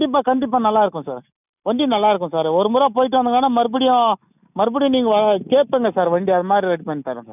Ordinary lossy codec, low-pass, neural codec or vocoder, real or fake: none; 3.6 kHz; none; real